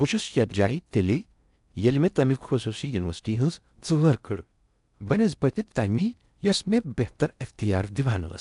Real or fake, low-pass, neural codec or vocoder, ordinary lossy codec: fake; 10.8 kHz; codec, 16 kHz in and 24 kHz out, 0.6 kbps, FocalCodec, streaming, 4096 codes; none